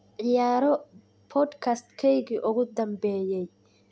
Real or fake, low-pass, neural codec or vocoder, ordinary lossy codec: real; none; none; none